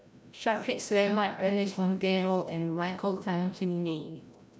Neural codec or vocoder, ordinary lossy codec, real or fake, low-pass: codec, 16 kHz, 0.5 kbps, FreqCodec, larger model; none; fake; none